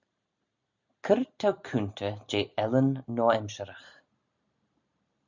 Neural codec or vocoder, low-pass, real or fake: none; 7.2 kHz; real